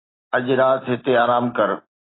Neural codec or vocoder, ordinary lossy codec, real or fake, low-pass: none; AAC, 16 kbps; real; 7.2 kHz